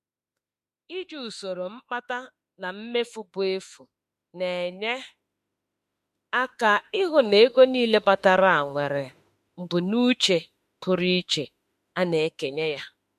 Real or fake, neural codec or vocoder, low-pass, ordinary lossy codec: fake; autoencoder, 48 kHz, 32 numbers a frame, DAC-VAE, trained on Japanese speech; 14.4 kHz; MP3, 64 kbps